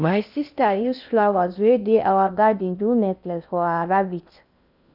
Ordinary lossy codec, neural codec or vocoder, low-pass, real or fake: none; codec, 16 kHz in and 24 kHz out, 0.6 kbps, FocalCodec, streaming, 2048 codes; 5.4 kHz; fake